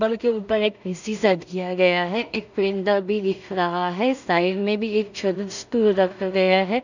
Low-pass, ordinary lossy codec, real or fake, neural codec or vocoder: 7.2 kHz; none; fake; codec, 16 kHz in and 24 kHz out, 0.4 kbps, LongCat-Audio-Codec, two codebook decoder